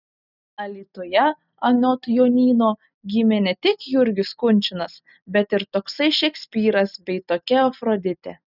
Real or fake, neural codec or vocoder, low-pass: real; none; 5.4 kHz